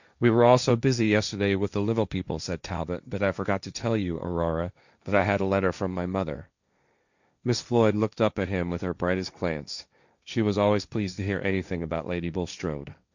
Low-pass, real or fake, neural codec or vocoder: 7.2 kHz; fake; codec, 16 kHz, 1.1 kbps, Voila-Tokenizer